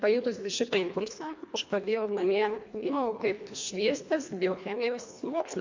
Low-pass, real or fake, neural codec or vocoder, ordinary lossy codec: 7.2 kHz; fake; codec, 24 kHz, 1.5 kbps, HILCodec; MP3, 48 kbps